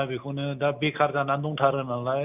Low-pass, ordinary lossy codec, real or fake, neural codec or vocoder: 3.6 kHz; none; real; none